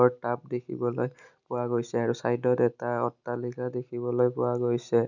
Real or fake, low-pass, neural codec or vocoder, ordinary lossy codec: real; 7.2 kHz; none; none